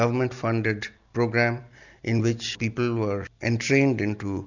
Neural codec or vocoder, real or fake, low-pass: none; real; 7.2 kHz